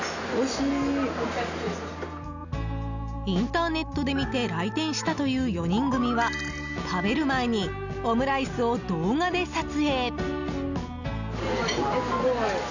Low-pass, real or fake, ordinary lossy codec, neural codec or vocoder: 7.2 kHz; real; none; none